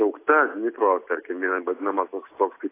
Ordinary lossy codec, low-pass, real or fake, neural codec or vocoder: AAC, 24 kbps; 3.6 kHz; real; none